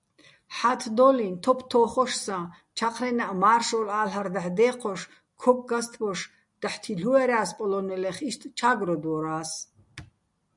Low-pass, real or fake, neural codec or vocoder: 10.8 kHz; real; none